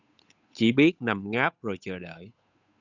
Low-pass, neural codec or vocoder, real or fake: 7.2 kHz; codec, 16 kHz, 8 kbps, FunCodec, trained on Chinese and English, 25 frames a second; fake